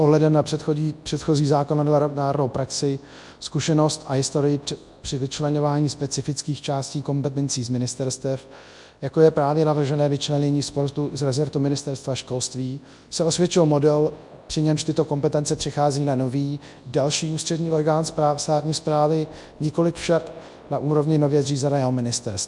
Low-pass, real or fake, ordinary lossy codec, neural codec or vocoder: 10.8 kHz; fake; MP3, 64 kbps; codec, 24 kHz, 0.9 kbps, WavTokenizer, large speech release